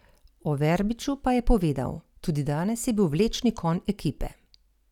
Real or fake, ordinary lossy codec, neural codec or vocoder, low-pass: real; none; none; 19.8 kHz